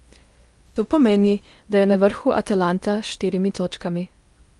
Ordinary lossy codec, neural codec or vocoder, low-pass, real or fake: Opus, 32 kbps; codec, 16 kHz in and 24 kHz out, 0.8 kbps, FocalCodec, streaming, 65536 codes; 10.8 kHz; fake